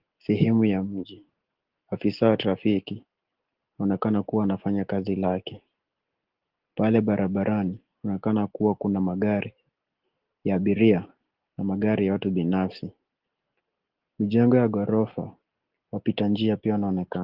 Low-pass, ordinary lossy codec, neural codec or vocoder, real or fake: 5.4 kHz; Opus, 16 kbps; none; real